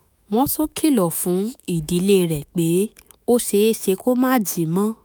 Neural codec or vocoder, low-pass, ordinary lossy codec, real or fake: autoencoder, 48 kHz, 128 numbers a frame, DAC-VAE, trained on Japanese speech; none; none; fake